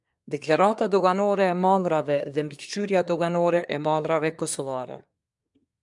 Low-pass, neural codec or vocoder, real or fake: 10.8 kHz; codec, 24 kHz, 1 kbps, SNAC; fake